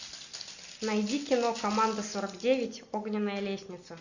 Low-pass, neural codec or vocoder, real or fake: 7.2 kHz; none; real